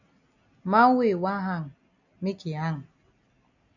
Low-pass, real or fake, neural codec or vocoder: 7.2 kHz; real; none